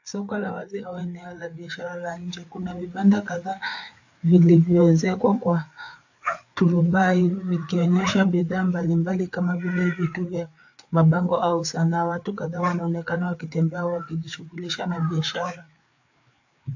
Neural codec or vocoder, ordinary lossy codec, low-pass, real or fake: codec, 16 kHz, 4 kbps, FreqCodec, larger model; AAC, 48 kbps; 7.2 kHz; fake